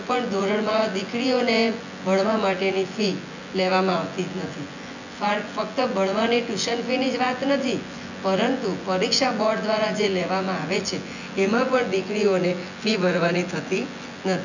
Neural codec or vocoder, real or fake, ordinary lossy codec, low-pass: vocoder, 24 kHz, 100 mel bands, Vocos; fake; none; 7.2 kHz